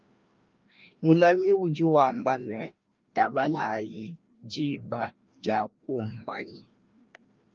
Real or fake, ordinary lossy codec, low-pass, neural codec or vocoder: fake; Opus, 32 kbps; 7.2 kHz; codec, 16 kHz, 1 kbps, FreqCodec, larger model